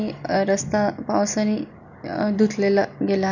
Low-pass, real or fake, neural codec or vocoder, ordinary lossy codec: 7.2 kHz; real; none; none